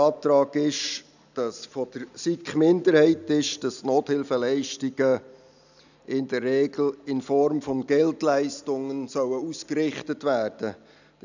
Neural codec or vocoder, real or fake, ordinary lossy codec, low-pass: none; real; none; 7.2 kHz